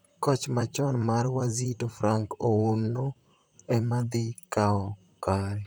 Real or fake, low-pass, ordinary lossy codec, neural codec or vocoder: fake; none; none; vocoder, 44.1 kHz, 128 mel bands, Pupu-Vocoder